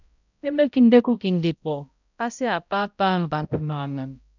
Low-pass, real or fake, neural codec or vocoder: 7.2 kHz; fake; codec, 16 kHz, 0.5 kbps, X-Codec, HuBERT features, trained on balanced general audio